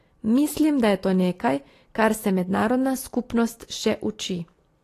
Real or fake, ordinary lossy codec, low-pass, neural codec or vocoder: real; AAC, 48 kbps; 14.4 kHz; none